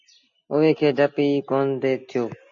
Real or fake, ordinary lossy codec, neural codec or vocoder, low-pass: real; AAC, 64 kbps; none; 7.2 kHz